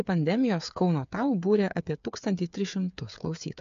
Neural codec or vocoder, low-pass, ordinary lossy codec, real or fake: codec, 16 kHz, 8 kbps, FreqCodec, smaller model; 7.2 kHz; MP3, 64 kbps; fake